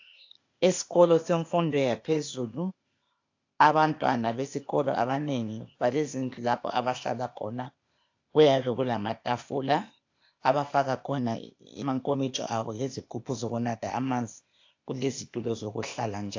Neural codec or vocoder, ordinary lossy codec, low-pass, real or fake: codec, 16 kHz, 0.8 kbps, ZipCodec; AAC, 48 kbps; 7.2 kHz; fake